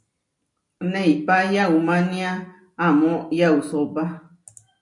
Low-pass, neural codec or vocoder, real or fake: 10.8 kHz; none; real